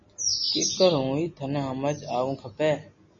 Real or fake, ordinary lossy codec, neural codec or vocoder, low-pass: real; MP3, 32 kbps; none; 7.2 kHz